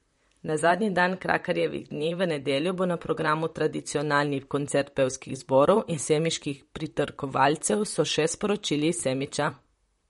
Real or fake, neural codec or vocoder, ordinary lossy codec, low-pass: fake; vocoder, 44.1 kHz, 128 mel bands, Pupu-Vocoder; MP3, 48 kbps; 19.8 kHz